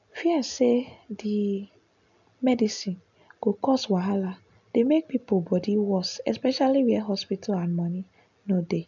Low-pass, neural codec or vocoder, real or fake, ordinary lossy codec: 7.2 kHz; none; real; none